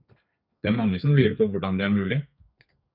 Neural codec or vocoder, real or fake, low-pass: codec, 32 kHz, 1.9 kbps, SNAC; fake; 5.4 kHz